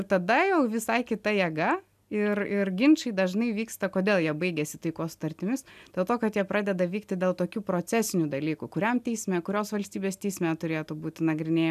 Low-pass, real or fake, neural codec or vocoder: 14.4 kHz; real; none